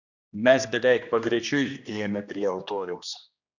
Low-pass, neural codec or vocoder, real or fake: 7.2 kHz; codec, 16 kHz, 1 kbps, X-Codec, HuBERT features, trained on general audio; fake